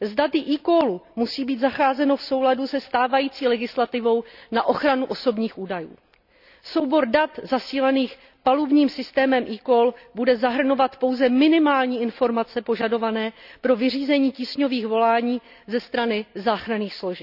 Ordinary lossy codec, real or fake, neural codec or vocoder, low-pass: none; real; none; 5.4 kHz